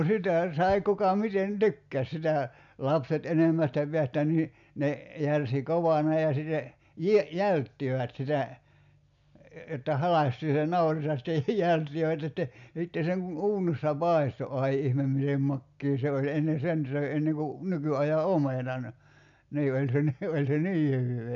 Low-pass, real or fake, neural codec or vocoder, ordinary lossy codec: 7.2 kHz; real; none; none